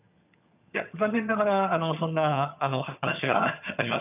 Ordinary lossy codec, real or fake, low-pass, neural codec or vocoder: none; fake; 3.6 kHz; vocoder, 22.05 kHz, 80 mel bands, HiFi-GAN